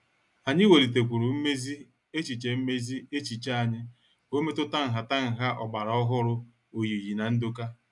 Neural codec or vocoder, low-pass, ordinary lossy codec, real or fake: none; 10.8 kHz; none; real